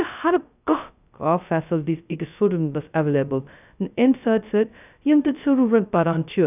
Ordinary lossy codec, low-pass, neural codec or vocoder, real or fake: none; 3.6 kHz; codec, 16 kHz, 0.2 kbps, FocalCodec; fake